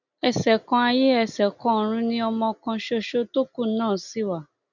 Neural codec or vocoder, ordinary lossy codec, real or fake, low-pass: none; none; real; 7.2 kHz